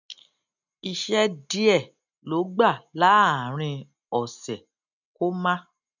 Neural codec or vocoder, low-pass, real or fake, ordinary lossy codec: none; 7.2 kHz; real; none